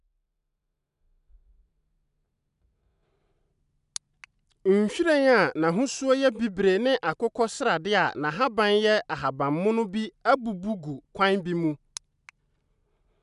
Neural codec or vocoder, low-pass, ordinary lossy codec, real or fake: none; 10.8 kHz; none; real